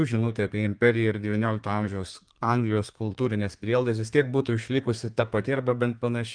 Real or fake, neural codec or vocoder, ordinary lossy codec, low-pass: fake; codec, 32 kHz, 1.9 kbps, SNAC; Opus, 32 kbps; 9.9 kHz